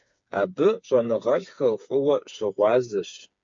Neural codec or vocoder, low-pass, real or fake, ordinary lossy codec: codec, 16 kHz, 4 kbps, FreqCodec, smaller model; 7.2 kHz; fake; MP3, 48 kbps